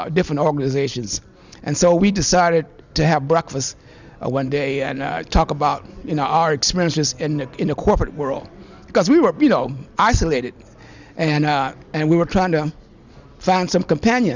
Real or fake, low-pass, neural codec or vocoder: real; 7.2 kHz; none